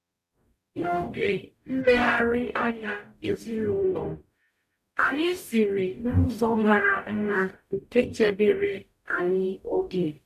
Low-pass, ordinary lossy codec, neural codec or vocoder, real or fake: 14.4 kHz; none; codec, 44.1 kHz, 0.9 kbps, DAC; fake